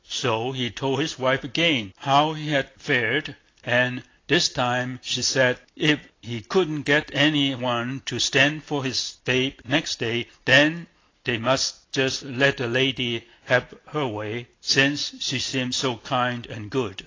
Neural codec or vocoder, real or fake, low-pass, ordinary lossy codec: none; real; 7.2 kHz; AAC, 32 kbps